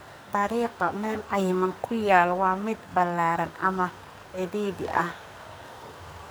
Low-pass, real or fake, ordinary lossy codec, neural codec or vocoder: none; fake; none; codec, 44.1 kHz, 2.6 kbps, SNAC